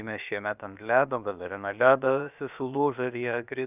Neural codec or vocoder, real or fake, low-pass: codec, 16 kHz, about 1 kbps, DyCAST, with the encoder's durations; fake; 3.6 kHz